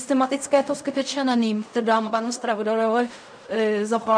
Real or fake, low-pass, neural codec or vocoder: fake; 9.9 kHz; codec, 16 kHz in and 24 kHz out, 0.4 kbps, LongCat-Audio-Codec, fine tuned four codebook decoder